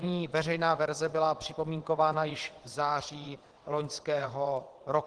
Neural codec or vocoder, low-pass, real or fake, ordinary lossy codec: vocoder, 22.05 kHz, 80 mel bands, WaveNeXt; 9.9 kHz; fake; Opus, 16 kbps